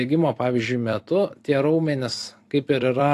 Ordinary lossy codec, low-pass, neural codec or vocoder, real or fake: AAC, 64 kbps; 14.4 kHz; vocoder, 44.1 kHz, 128 mel bands every 256 samples, BigVGAN v2; fake